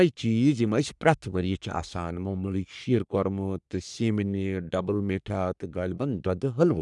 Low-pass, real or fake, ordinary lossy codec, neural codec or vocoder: 10.8 kHz; fake; none; codec, 44.1 kHz, 3.4 kbps, Pupu-Codec